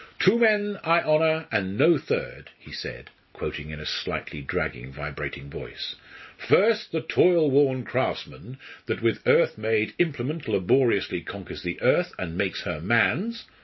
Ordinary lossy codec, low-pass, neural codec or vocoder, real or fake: MP3, 24 kbps; 7.2 kHz; none; real